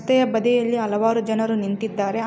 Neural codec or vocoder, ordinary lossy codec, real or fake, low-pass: none; none; real; none